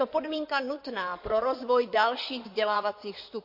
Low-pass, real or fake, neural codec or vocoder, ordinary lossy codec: 5.4 kHz; fake; codec, 16 kHz in and 24 kHz out, 2.2 kbps, FireRedTTS-2 codec; MP3, 32 kbps